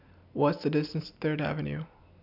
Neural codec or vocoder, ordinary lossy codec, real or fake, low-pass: none; none; real; 5.4 kHz